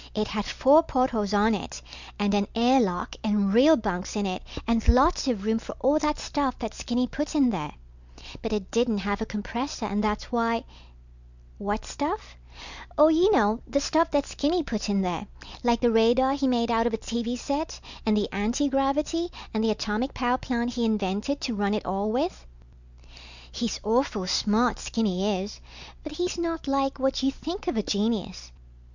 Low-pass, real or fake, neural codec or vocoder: 7.2 kHz; real; none